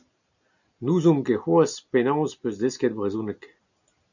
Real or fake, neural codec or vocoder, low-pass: real; none; 7.2 kHz